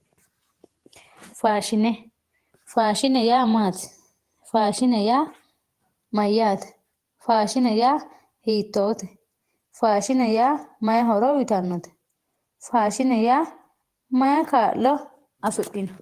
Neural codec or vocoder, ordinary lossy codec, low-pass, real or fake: vocoder, 48 kHz, 128 mel bands, Vocos; Opus, 24 kbps; 14.4 kHz; fake